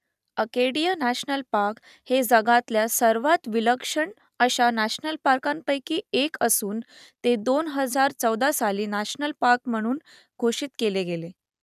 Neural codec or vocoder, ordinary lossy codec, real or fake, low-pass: none; none; real; 14.4 kHz